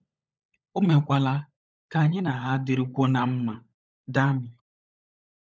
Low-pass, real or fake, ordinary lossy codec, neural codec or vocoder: none; fake; none; codec, 16 kHz, 16 kbps, FunCodec, trained on LibriTTS, 50 frames a second